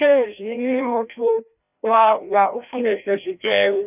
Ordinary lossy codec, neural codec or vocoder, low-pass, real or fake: none; codec, 16 kHz, 1 kbps, FreqCodec, larger model; 3.6 kHz; fake